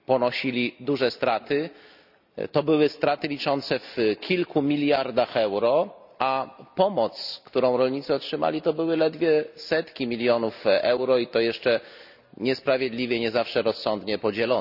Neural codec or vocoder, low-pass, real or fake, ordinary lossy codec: none; 5.4 kHz; real; none